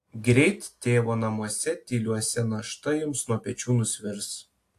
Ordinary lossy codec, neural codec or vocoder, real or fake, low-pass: AAC, 64 kbps; none; real; 14.4 kHz